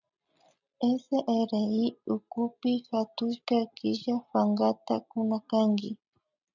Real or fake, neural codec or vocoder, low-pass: real; none; 7.2 kHz